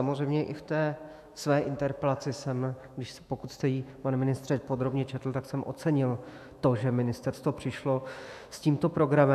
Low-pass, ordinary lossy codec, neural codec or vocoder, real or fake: 14.4 kHz; AAC, 96 kbps; autoencoder, 48 kHz, 128 numbers a frame, DAC-VAE, trained on Japanese speech; fake